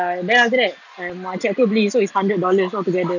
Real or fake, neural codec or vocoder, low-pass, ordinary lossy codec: real; none; 7.2 kHz; none